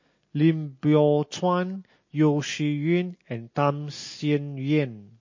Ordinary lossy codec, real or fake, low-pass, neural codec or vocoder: MP3, 32 kbps; real; 7.2 kHz; none